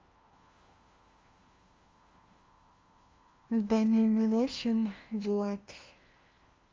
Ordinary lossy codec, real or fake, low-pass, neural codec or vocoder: Opus, 32 kbps; fake; 7.2 kHz; codec, 16 kHz, 1 kbps, FunCodec, trained on LibriTTS, 50 frames a second